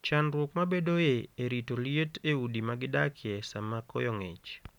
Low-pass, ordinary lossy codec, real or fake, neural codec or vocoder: 19.8 kHz; none; real; none